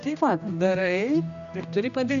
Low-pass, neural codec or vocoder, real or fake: 7.2 kHz; codec, 16 kHz, 1 kbps, X-Codec, HuBERT features, trained on general audio; fake